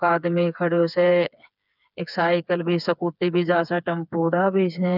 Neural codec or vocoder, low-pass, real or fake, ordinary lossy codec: codec, 16 kHz, 4 kbps, FreqCodec, smaller model; 5.4 kHz; fake; none